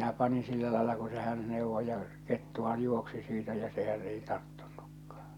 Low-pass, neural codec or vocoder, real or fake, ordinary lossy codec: 19.8 kHz; none; real; none